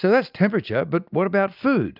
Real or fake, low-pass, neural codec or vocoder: real; 5.4 kHz; none